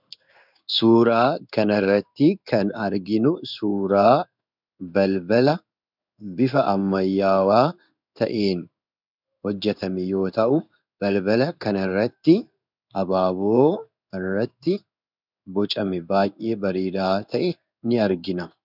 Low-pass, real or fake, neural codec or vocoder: 5.4 kHz; fake; codec, 16 kHz in and 24 kHz out, 1 kbps, XY-Tokenizer